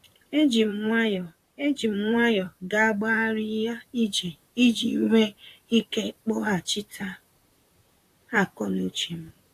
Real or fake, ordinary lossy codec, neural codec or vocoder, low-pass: fake; AAC, 64 kbps; vocoder, 44.1 kHz, 128 mel bands, Pupu-Vocoder; 14.4 kHz